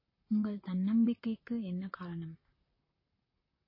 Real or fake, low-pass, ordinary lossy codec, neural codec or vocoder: fake; 5.4 kHz; MP3, 24 kbps; vocoder, 44.1 kHz, 128 mel bands, Pupu-Vocoder